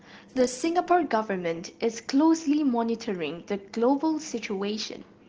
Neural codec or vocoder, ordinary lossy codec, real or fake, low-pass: none; Opus, 16 kbps; real; 7.2 kHz